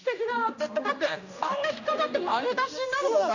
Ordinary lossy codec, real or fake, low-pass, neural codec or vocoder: none; fake; 7.2 kHz; codec, 16 kHz, 1 kbps, X-Codec, HuBERT features, trained on general audio